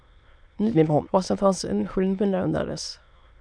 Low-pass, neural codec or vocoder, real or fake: 9.9 kHz; autoencoder, 22.05 kHz, a latent of 192 numbers a frame, VITS, trained on many speakers; fake